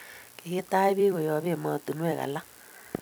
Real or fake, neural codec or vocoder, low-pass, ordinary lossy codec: fake; vocoder, 44.1 kHz, 128 mel bands every 256 samples, BigVGAN v2; none; none